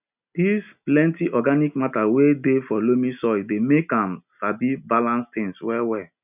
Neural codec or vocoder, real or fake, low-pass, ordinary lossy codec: none; real; 3.6 kHz; none